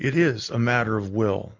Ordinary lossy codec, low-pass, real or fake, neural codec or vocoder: MP3, 48 kbps; 7.2 kHz; real; none